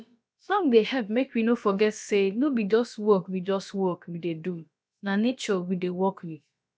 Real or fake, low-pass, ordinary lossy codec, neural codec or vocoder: fake; none; none; codec, 16 kHz, about 1 kbps, DyCAST, with the encoder's durations